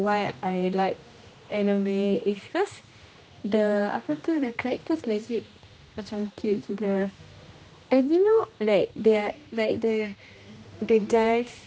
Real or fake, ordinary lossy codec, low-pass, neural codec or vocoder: fake; none; none; codec, 16 kHz, 1 kbps, X-Codec, HuBERT features, trained on general audio